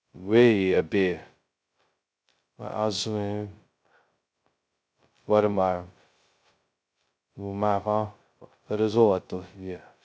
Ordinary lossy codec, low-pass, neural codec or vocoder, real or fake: none; none; codec, 16 kHz, 0.2 kbps, FocalCodec; fake